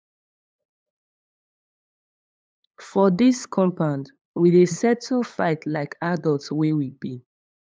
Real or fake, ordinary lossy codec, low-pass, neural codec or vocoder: fake; none; none; codec, 16 kHz, 8 kbps, FunCodec, trained on LibriTTS, 25 frames a second